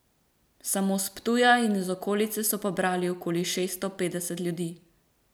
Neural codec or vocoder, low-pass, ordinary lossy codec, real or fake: vocoder, 44.1 kHz, 128 mel bands every 256 samples, BigVGAN v2; none; none; fake